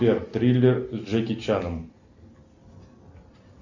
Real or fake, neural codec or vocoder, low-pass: real; none; 7.2 kHz